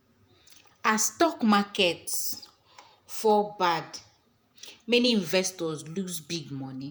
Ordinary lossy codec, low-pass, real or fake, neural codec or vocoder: none; none; fake; vocoder, 48 kHz, 128 mel bands, Vocos